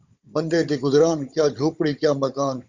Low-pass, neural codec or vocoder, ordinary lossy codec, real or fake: 7.2 kHz; codec, 16 kHz, 16 kbps, FunCodec, trained on Chinese and English, 50 frames a second; Opus, 64 kbps; fake